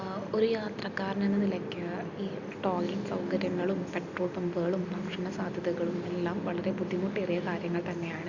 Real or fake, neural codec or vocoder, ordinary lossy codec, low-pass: real; none; Opus, 64 kbps; 7.2 kHz